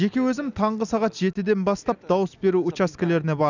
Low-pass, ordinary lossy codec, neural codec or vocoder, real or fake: 7.2 kHz; none; none; real